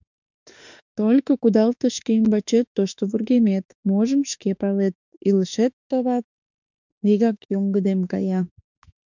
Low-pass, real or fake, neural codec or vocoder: 7.2 kHz; fake; autoencoder, 48 kHz, 32 numbers a frame, DAC-VAE, trained on Japanese speech